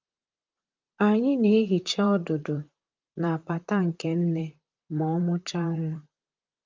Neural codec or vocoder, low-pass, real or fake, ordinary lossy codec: vocoder, 44.1 kHz, 128 mel bands, Pupu-Vocoder; 7.2 kHz; fake; Opus, 24 kbps